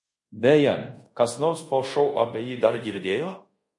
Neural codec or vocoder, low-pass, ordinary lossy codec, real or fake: codec, 24 kHz, 0.5 kbps, DualCodec; 10.8 kHz; MP3, 48 kbps; fake